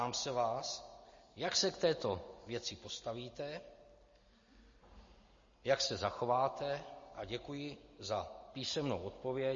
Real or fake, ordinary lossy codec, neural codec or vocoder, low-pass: real; MP3, 32 kbps; none; 7.2 kHz